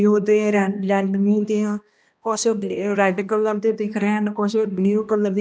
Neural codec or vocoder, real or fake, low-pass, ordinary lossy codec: codec, 16 kHz, 1 kbps, X-Codec, HuBERT features, trained on balanced general audio; fake; none; none